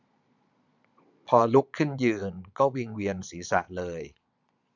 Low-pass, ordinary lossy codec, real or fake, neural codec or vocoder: 7.2 kHz; none; fake; vocoder, 22.05 kHz, 80 mel bands, Vocos